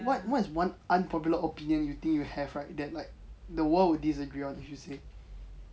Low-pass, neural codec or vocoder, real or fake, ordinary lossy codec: none; none; real; none